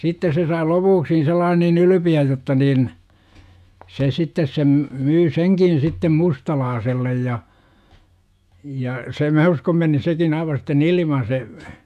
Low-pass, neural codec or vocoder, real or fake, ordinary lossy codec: 14.4 kHz; autoencoder, 48 kHz, 128 numbers a frame, DAC-VAE, trained on Japanese speech; fake; none